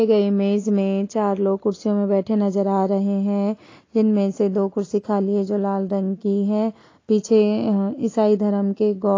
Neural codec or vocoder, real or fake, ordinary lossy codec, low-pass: none; real; AAC, 32 kbps; 7.2 kHz